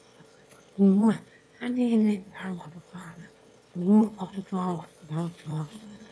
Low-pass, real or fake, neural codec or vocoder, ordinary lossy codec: none; fake; autoencoder, 22.05 kHz, a latent of 192 numbers a frame, VITS, trained on one speaker; none